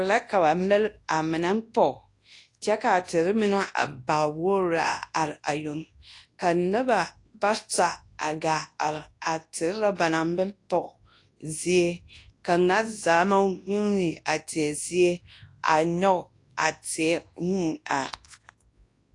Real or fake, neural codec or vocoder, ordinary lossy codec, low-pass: fake; codec, 24 kHz, 0.9 kbps, WavTokenizer, large speech release; AAC, 48 kbps; 10.8 kHz